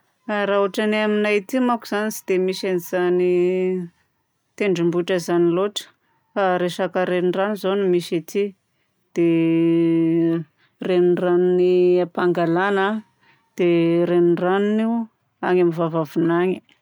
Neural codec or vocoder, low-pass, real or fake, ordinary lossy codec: none; none; real; none